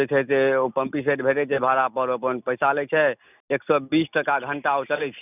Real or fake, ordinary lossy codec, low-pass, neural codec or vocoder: real; none; 3.6 kHz; none